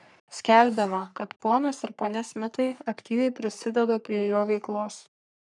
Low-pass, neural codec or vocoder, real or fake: 10.8 kHz; codec, 44.1 kHz, 3.4 kbps, Pupu-Codec; fake